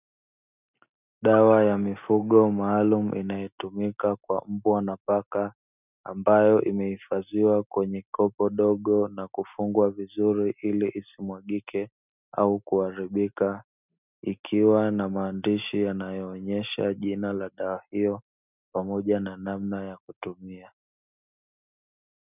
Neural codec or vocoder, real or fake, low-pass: none; real; 3.6 kHz